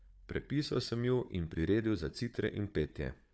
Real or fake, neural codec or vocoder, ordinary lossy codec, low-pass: fake; codec, 16 kHz, 4 kbps, FunCodec, trained on Chinese and English, 50 frames a second; none; none